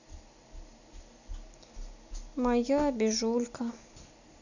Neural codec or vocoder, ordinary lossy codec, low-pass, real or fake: none; none; 7.2 kHz; real